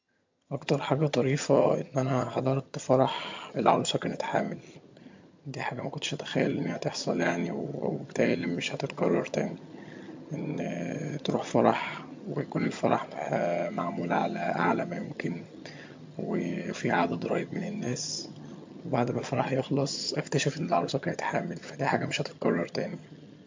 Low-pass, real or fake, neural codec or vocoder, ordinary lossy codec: 7.2 kHz; fake; vocoder, 22.05 kHz, 80 mel bands, HiFi-GAN; MP3, 48 kbps